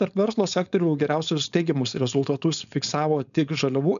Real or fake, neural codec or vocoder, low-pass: fake; codec, 16 kHz, 4.8 kbps, FACodec; 7.2 kHz